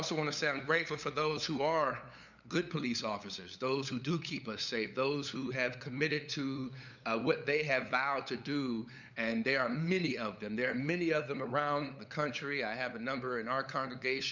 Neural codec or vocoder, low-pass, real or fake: codec, 16 kHz, 8 kbps, FunCodec, trained on LibriTTS, 25 frames a second; 7.2 kHz; fake